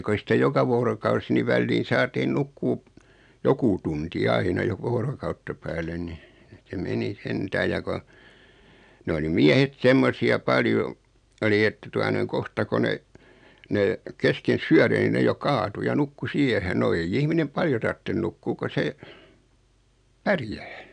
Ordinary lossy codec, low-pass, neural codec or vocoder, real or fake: none; 9.9 kHz; none; real